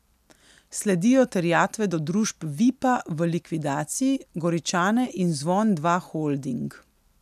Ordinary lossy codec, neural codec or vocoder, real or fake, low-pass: none; none; real; 14.4 kHz